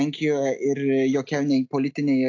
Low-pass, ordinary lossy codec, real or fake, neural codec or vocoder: 7.2 kHz; AAC, 48 kbps; real; none